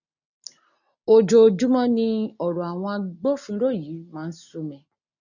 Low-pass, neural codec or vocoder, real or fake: 7.2 kHz; none; real